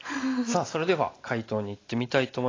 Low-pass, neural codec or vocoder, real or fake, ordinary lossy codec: 7.2 kHz; none; real; AAC, 32 kbps